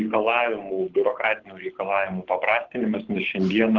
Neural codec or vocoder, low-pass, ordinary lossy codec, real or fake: codec, 24 kHz, 6 kbps, HILCodec; 7.2 kHz; Opus, 16 kbps; fake